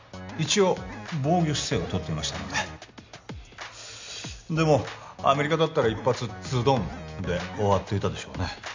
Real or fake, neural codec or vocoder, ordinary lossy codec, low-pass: real; none; MP3, 64 kbps; 7.2 kHz